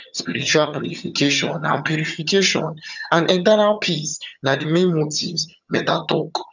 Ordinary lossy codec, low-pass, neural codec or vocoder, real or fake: none; 7.2 kHz; vocoder, 22.05 kHz, 80 mel bands, HiFi-GAN; fake